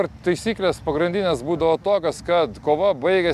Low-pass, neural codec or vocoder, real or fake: 14.4 kHz; none; real